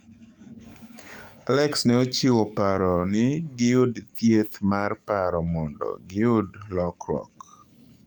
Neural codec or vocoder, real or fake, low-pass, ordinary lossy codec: codec, 44.1 kHz, 7.8 kbps, DAC; fake; 19.8 kHz; none